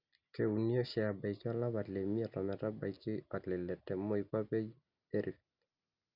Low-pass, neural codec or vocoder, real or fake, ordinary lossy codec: 5.4 kHz; none; real; none